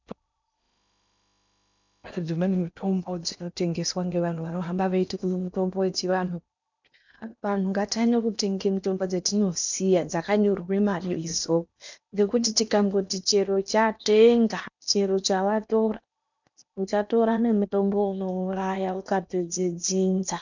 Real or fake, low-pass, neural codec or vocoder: fake; 7.2 kHz; codec, 16 kHz in and 24 kHz out, 0.8 kbps, FocalCodec, streaming, 65536 codes